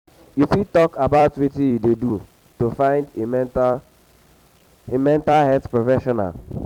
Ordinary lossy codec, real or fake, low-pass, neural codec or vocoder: none; fake; 19.8 kHz; vocoder, 44.1 kHz, 128 mel bands every 256 samples, BigVGAN v2